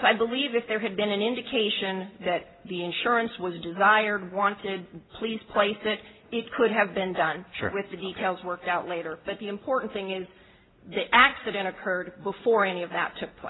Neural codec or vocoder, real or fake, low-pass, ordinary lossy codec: none; real; 7.2 kHz; AAC, 16 kbps